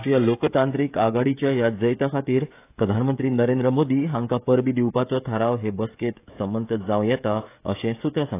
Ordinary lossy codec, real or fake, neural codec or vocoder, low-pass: AAC, 24 kbps; fake; codec, 16 kHz, 16 kbps, FreqCodec, smaller model; 3.6 kHz